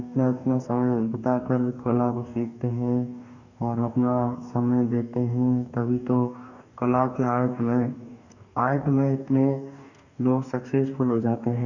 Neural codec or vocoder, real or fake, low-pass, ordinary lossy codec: codec, 44.1 kHz, 2.6 kbps, DAC; fake; 7.2 kHz; none